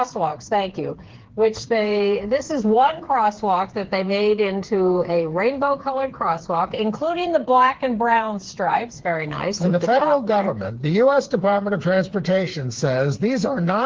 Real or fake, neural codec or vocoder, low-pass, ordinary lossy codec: fake; codec, 16 kHz, 4 kbps, FreqCodec, smaller model; 7.2 kHz; Opus, 16 kbps